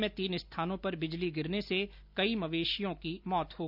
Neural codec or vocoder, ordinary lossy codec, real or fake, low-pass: none; none; real; 5.4 kHz